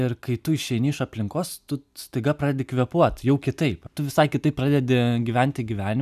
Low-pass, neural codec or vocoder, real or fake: 14.4 kHz; none; real